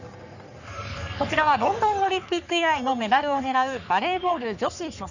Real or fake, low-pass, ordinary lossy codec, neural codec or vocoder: fake; 7.2 kHz; none; codec, 44.1 kHz, 3.4 kbps, Pupu-Codec